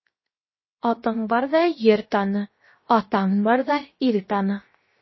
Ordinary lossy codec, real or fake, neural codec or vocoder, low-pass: MP3, 24 kbps; fake; codec, 16 kHz, 0.7 kbps, FocalCodec; 7.2 kHz